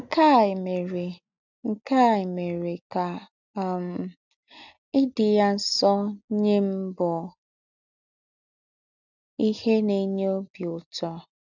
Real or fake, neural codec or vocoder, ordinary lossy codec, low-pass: real; none; none; 7.2 kHz